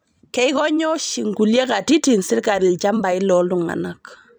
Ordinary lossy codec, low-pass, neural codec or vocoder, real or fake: none; none; vocoder, 44.1 kHz, 128 mel bands every 256 samples, BigVGAN v2; fake